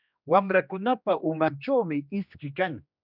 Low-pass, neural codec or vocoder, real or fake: 5.4 kHz; codec, 16 kHz, 2 kbps, X-Codec, HuBERT features, trained on general audio; fake